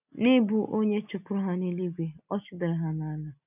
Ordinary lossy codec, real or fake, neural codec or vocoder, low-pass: none; real; none; 3.6 kHz